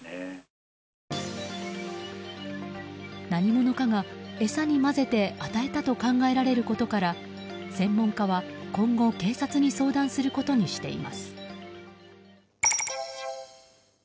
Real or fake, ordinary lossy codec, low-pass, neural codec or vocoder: real; none; none; none